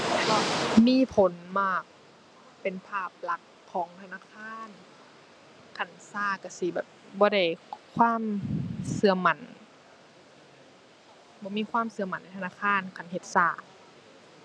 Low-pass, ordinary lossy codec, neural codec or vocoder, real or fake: none; none; none; real